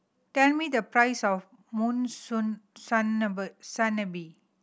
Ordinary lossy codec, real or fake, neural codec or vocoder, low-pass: none; real; none; none